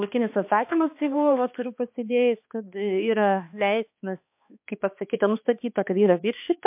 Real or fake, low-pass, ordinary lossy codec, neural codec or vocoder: fake; 3.6 kHz; MP3, 32 kbps; codec, 16 kHz, 2 kbps, X-Codec, HuBERT features, trained on balanced general audio